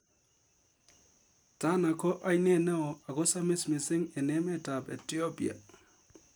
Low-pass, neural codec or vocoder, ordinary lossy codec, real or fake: none; none; none; real